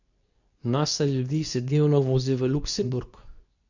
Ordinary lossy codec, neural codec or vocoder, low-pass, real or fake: none; codec, 24 kHz, 0.9 kbps, WavTokenizer, medium speech release version 2; 7.2 kHz; fake